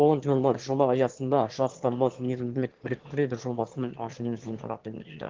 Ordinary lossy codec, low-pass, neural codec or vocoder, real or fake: Opus, 16 kbps; 7.2 kHz; autoencoder, 22.05 kHz, a latent of 192 numbers a frame, VITS, trained on one speaker; fake